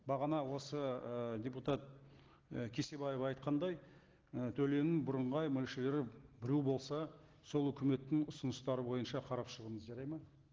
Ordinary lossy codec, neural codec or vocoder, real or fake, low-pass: Opus, 16 kbps; none; real; 7.2 kHz